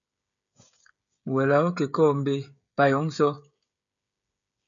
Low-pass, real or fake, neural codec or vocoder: 7.2 kHz; fake; codec, 16 kHz, 16 kbps, FreqCodec, smaller model